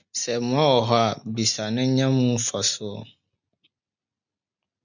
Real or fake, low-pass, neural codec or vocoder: real; 7.2 kHz; none